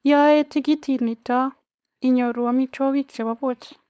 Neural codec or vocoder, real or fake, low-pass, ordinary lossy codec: codec, 16 kHz, 4.8 kbps, FACodec; fake; none; none